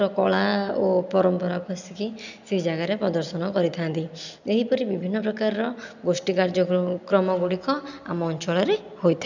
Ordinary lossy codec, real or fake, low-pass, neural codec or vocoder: none; real; 7.2 kHz; none